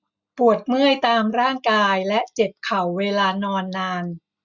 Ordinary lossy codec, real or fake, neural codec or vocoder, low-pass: none; real; none; 7.2 kHz